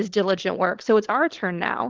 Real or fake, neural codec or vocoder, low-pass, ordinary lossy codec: fake; codec, 16 kHz, 16 kbps, FunCodec, trained on LibriTTS, 50 frames a second; 7.2 kHz; Opus, 16 kbps